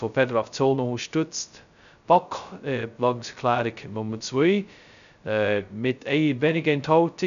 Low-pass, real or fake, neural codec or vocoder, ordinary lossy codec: 7.2 kHz; fake; codec, 16 kHz, 0.2 kbps, FocalCodec; none